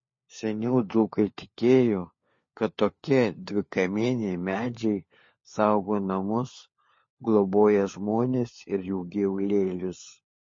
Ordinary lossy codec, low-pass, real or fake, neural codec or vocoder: MP3, 32 kbps; 7.2 kHz; fake; codec, 16 kHz, 4 kbps, FunCodec, trained on LibriTTS, 50 frames a second